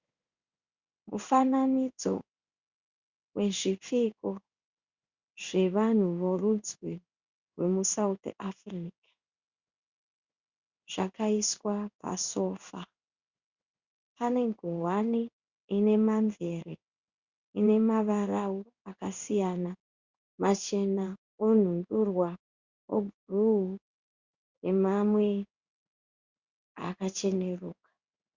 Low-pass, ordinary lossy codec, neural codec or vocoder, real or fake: 7.2 kHz; Opus, 64 kbps; codec, 16 kHz in and 24 kHz out, 1 kbps, XY-Tokenizer; fake